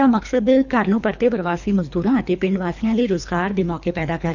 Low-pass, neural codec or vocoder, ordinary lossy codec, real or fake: 7.2 kHz; codec, 24 kHz, 3 kbps, HILCodec; none; fake